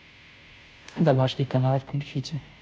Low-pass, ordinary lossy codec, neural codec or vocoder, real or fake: none; none; codec, 16 kHz, 0.5 kbps, FunCodec, trained on Chinese and English, 25 frames a second; fake